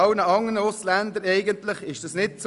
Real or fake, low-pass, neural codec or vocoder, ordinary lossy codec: real; 10.8 kHz; none; none